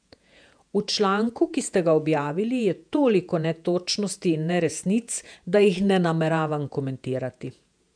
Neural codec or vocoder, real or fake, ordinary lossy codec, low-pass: vocoder, 48 kHz, 128 mel bands, Vocos; fake; MP3, 96 kbps; 9.9 kHz